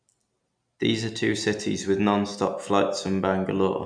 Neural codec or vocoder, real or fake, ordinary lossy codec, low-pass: none; real; none; 9.9 kHz